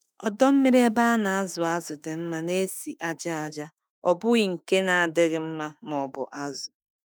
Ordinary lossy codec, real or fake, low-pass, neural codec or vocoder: none; fake; none; autoencoder, 48 kHz, 32 numbers a frame, DAC-VAE, trained on Japanese speech